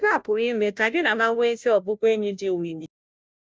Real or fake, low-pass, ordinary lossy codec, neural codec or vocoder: fake; none; none; codec, 16 kHz, 0.5 kbps, FunCodec, trained on Chinese and English, 25 frames a second